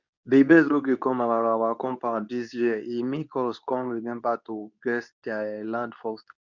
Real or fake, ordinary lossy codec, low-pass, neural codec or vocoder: fake; none; 7.2 kHz; codec, 24 kHz, 0.9 kbps, WavTokenizer, medium speech release version 2